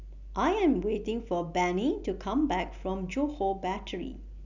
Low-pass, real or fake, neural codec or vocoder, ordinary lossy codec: 7.2 kHz; real; none; none